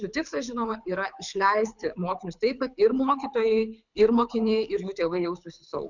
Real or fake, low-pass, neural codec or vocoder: fake; 7.2 kHz; codec, 24 kHz, 6 kbps, HILCodec